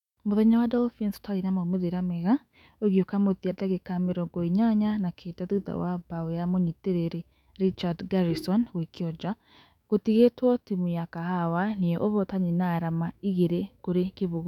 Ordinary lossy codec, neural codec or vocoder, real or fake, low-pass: none; autoencoder, 48 kHz, 128 numbers a frame, DAC-VAE, trained on Japanese speech; fake; 19.8 kHz